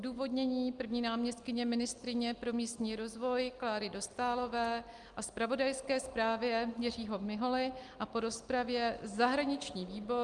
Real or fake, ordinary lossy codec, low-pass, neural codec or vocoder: real; Opus, 32 kbps; 10.8 kHz; none